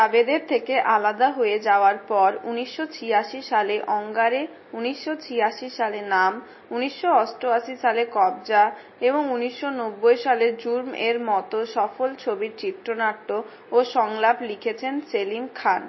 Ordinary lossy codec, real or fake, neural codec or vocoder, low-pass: MP3, 24 kbps; real; none; 7.2 kHz